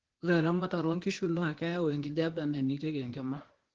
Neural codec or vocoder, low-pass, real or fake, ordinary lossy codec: codec, 16 kHz, 0.8 kbps, ZipCodec; 7.2 kHz; fake; Opus, 16 kbps